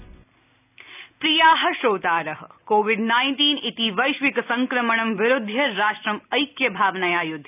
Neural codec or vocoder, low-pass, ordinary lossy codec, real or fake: none; 3.6 kHz; none; real